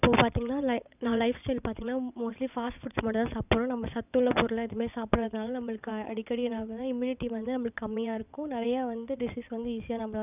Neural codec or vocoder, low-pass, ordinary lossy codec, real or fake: vocoder, 22.05 kHz, 80 mel bands, WaveNeXt; 3.6 kHz; none; fake